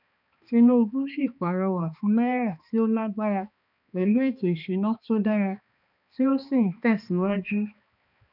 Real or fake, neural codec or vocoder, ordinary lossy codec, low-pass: fake; codec, 16 kHz, 2 kbps, X-Codec, HuBERT features, trained on balanced general audio; none; 5.4 kHz